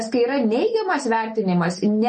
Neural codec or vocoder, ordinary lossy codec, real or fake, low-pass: none; MP3, 32 kbps; real; 10.8 kHz